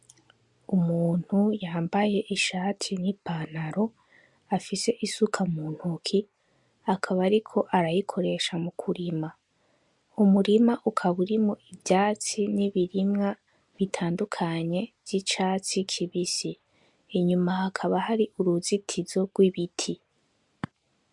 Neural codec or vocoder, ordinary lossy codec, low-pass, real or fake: none; MP3, 64 kbps; 10.8 kHz; real